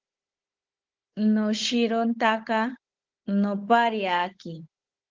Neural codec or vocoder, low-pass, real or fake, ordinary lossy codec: codec, 16 kHz, 16 kbps, FunCodec, trained on Chinese and English, 50 frames a second; 7.2 kHz; fake; Opus, 16 kbps